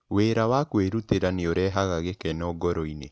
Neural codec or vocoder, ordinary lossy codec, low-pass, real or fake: none; none; none; real